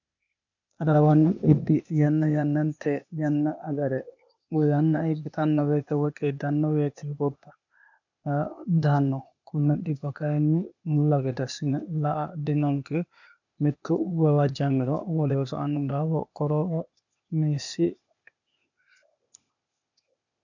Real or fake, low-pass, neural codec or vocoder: fake; 7.2 kHz; codec, 16 kHz, 0.8 kbps, ZipCodec